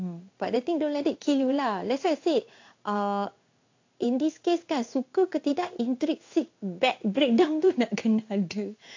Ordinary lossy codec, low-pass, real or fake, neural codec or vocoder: AAC, 48 kbps; 7.2 kHz; fake; codec, 16 kHz in and 24 kHz out, 1 kbps, XY-Tokenizer